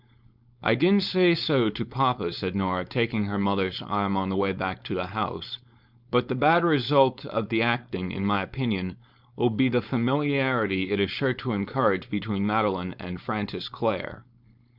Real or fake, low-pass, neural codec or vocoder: fake; 5.4 kHz; codec, 16 kHz, 4.8 kbps, FACodec